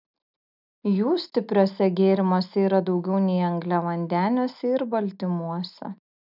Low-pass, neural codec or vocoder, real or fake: 5.4 kHz; none; real